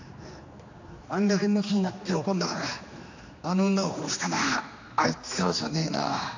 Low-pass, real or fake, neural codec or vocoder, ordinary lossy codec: 7.2 kHz; fake; codec, 16 kHz, 2 kbps, X-Codec, HuBERT features, trained on general audio; AAC, 48 kbps